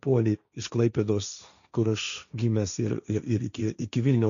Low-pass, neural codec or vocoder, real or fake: 7.2 kHz; codec, 16 kHz, 1.1 kbps, Voila-Tokenizer; fake